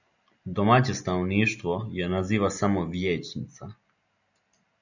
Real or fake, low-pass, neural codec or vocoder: real; 7.2 kHz; none